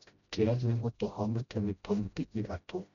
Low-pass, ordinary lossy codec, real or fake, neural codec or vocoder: 7.2 kHz; none; fake; codec, 16 kHz, 0.5 kbps, FreqCodec, smaller model